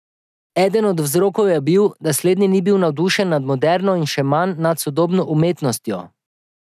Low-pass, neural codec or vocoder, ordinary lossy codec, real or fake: 14.4 kHz; none; none; real